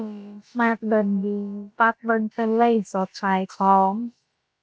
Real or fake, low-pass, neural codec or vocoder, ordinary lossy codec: fake; none; codec, 16 kHz, about 1 kbps, DyCAST, with the encoder's durations; none